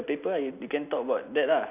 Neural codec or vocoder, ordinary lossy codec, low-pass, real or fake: none; none; 3.6 kHz; real